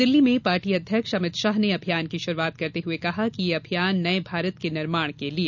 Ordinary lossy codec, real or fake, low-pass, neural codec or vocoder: none; real; 7.2 kHz; none